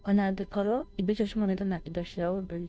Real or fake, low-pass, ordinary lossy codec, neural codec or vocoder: fake; none; none; codec, 16 kHz, 0.5 kbps, FunCodec, trained on Chinese and English, 25 frames a second